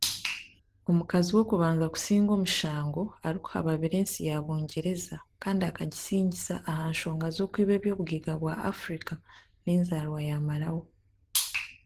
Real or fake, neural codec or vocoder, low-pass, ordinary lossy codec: real; none; 14.4 kHz; Opus, 16 kbps